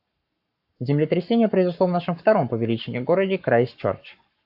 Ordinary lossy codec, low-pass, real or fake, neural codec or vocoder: AAC, 48 kbps; 5.4 kHz; fake; codec, 44.1 kHz, 7.8 kbps, Pupu-Codec